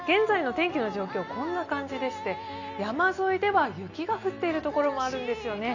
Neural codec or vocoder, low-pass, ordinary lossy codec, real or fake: none; 7.2 kHz; none; real